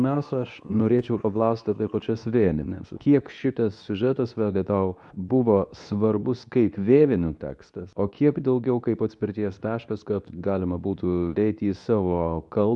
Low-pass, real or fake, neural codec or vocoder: 10.8 kHz; fake; codec, 24 kHz, 0.9 kbps, WavTokenizer, medium speech release version 2